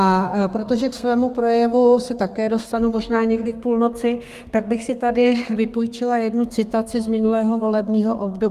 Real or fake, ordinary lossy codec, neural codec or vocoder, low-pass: fake; Opus, 32 kbps; codec, 32 kHz, 1.9 kbps, SNAC; 14.4 kHz